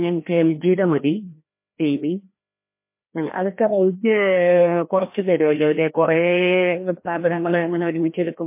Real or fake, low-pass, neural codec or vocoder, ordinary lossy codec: fake; 3.6 kHz; codec, 16 kHz, 1 kbps, FreqCodec, larger model; MP3, 24 kbps